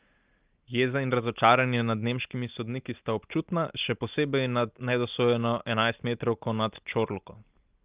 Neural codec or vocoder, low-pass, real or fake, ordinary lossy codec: none; 3.6 kHz; real; Opus, 32 kbps